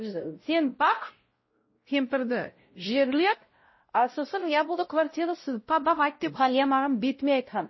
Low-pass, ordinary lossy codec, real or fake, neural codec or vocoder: 7.2 kHz; MP3, 24 kbps; fake; codec, 16 kHz, 0.5 kbps, X-Codec, WavLM features, trained on Multilingual LibriSpeech